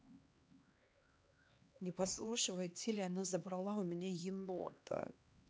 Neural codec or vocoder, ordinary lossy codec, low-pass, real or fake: codec, 16 kHz, 2 kbps, X-Codec, HuBERT features, trained on LibriSpeech; none; none; fake